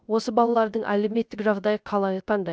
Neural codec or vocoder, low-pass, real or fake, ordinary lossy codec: codec, 16 kHz, 0.3 kbps, FocalCodec; none; fake; none